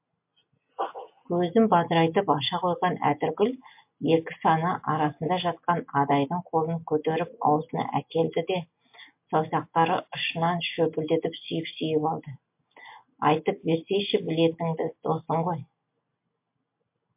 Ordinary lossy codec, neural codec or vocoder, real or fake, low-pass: MP3, 32 kbps; none; real; 3.6 kHz